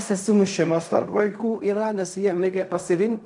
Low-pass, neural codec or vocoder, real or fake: 10.8 kHz; codec, 16 kHz in and 24 kHz out, 0.4 kbps, LongCat-Audio-Codec, fine tuned four codebook decoder; fake